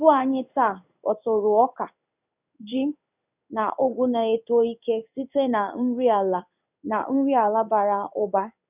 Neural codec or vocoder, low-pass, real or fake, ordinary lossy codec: codec, 16 kHz in and 24 kHz out, 1 kbps, XY-Tokenizer; 3.6 kHz; fake; none